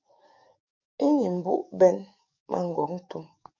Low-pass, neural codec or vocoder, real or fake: 7.2 kHz; codec, 44.1 kHz, 7.8 kbps, DAC; fake